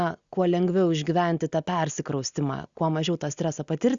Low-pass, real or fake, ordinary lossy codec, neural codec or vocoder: 7.2 kHz; real; Opus, 64 kbps; none